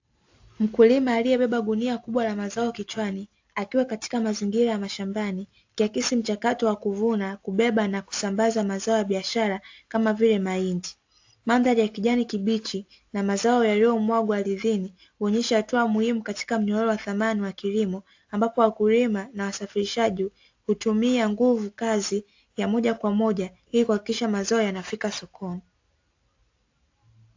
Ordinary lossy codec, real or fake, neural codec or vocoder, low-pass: AAC, 48 kbps; real; none; 7.2 kHz